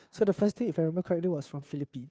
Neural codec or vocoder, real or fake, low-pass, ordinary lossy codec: codec, 16 kHz, 2 kbps, FunCodec, trained on Chinese and English, 25 frames a second; fake; none; none